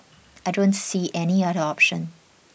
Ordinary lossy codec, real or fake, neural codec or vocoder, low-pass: none; real; none; none